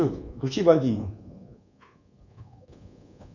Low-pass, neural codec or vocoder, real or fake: 7.2 kHz; codec, 24 kHz, 1.2 kbps, DualCodec; fake